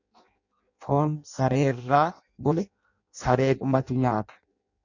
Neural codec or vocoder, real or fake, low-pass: codec, 16 kHz in and 24 kHz out, 0.6 kbps, FireRedTTS-2 codec; fake; 7.2 kHz